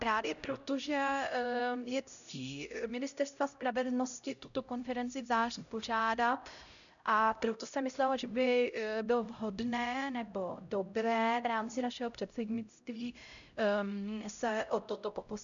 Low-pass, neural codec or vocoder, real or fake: 7.2 kHz; codec, 16 kHz, 0.5 kbps, X-Codec, HuBERT features, trained on LibriSpeech; fake